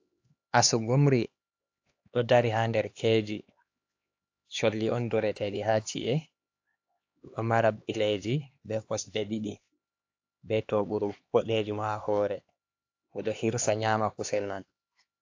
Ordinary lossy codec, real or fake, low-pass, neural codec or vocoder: AAC, 48 kbps; fake; 7.2 kHz; codec, 16 kHz, 2 kbps, X-Codec, HuBERT features, trained on LibriSpeech